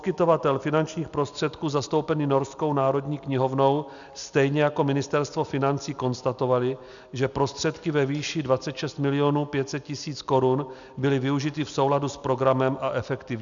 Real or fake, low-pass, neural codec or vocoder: real; 7.2 kHz; none